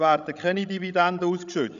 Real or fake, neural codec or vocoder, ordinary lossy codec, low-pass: fake; codec, 16 kHz, 16 kbps, FreqCodec, larger model; none; 7.2 kHz